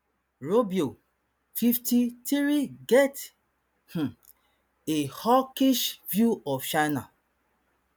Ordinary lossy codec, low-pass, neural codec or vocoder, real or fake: none; none; none; real